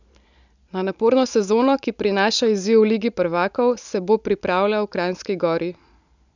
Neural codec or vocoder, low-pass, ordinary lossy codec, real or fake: none; 7.2 kHz; none; real